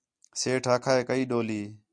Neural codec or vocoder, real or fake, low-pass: none; real; 9.9 kHz